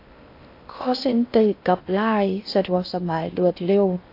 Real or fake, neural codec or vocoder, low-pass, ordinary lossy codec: fake; codec, 16 kHz in and 24 kHz out, 0.6 kbps, FocalCodec, streaming, 4096 codes; 5.4 kHz; AAC, 32 kbps